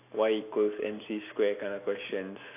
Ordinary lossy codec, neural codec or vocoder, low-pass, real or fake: AAC, 24 kbps; none; 3.6 kHz; real